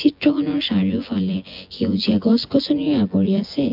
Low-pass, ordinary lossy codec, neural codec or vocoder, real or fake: 5.4 kHz; MP3, 48 kbps; vocoder, 24 kHz, 100 mel bands, Vocos; fake